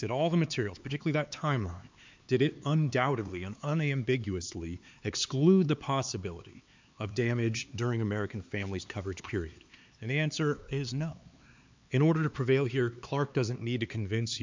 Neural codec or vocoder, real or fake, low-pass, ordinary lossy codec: codec, 16 kHz, 4 kbps, X-Codec, HuBERT features, trained on LibriSpeech; fake; 7.2 kHz; MP3, 64 kbps